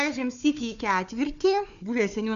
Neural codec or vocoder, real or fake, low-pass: codec, 16 kHz, 4 kbps, FunCodec, trained on Chinese and English, 50 frames a second; fake; 7.2 kHz